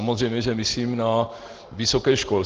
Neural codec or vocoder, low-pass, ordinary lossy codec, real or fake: none; 7.2 kHz; Opus, 16 kbps; real